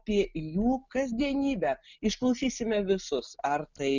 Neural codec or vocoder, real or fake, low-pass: none; real; 7.2 kHz